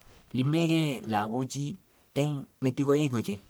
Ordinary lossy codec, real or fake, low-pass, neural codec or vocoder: none; fake; none; codec, 44.1 kHz, 1.7 kbps, Pupu-Codec